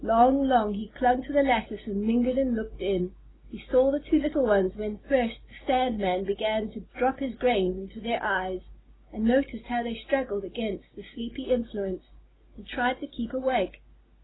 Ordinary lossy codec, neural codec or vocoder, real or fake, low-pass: AAC, 16 kbps; none; real; 7.2 kHz